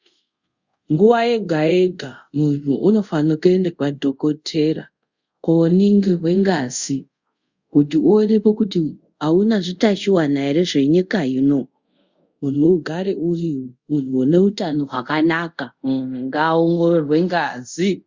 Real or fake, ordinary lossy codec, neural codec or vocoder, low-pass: fake; Opus, 64 kbps; codec, 24 kHz, 0.5 kbps, DualCodec; 7.2 kHz